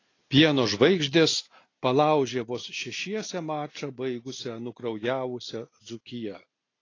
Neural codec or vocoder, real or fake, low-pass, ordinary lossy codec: none; real; 7.2 kHz; AAC, 32 kbps